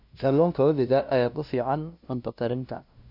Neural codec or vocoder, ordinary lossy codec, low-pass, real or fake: codec, 16 kHz, 0.5 kbps, FunCodec, trained on LibriTTS, 25 frames a second; none; 5.4 kHz; fake